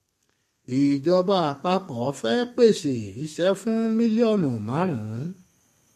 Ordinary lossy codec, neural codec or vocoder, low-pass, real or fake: MP3, 64 kbps; codec, 32 kHz, 1.9 kbps, SNAC; 14.4 kHz; fake